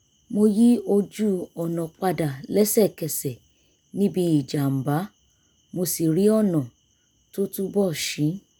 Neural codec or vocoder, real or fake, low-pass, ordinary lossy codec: none; real; none; none